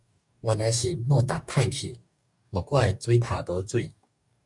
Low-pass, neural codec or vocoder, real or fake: 10.8 kHz; codec, 44.1 kHz, 2.6 kbps, DAC; fake